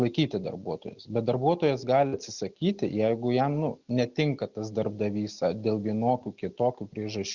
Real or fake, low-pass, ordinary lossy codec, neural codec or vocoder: real; 7.2 kHz; Opus, 64 kbps; none